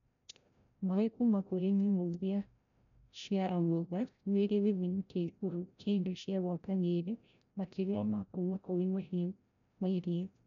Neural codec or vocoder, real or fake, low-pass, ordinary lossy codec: codec, 16 kHz, 0.5 kbps, FreqCodec, larger model; fake; 7.2 kHz; none